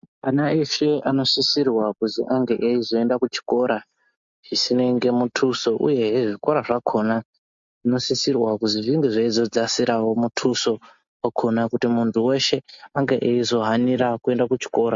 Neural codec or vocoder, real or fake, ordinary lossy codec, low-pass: none; real; MP3, 48 kbps; 7.2 kHz